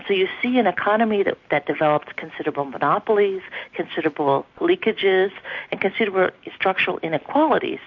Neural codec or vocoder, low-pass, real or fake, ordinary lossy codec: none; 7.2 kHz; real; MP3, 48 kbps